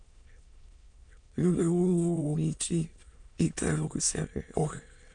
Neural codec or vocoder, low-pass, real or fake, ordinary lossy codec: autoencoder, 22.05 kHz, a latent of 192 numbers a frame, VITS, trained on many speakers; 9.9 kHz; fake; none